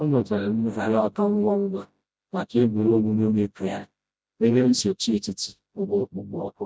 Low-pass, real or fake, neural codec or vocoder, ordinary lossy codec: none; fake; codec, 16 kHz, 0.5 kbps, FreqCodec, smaller model; none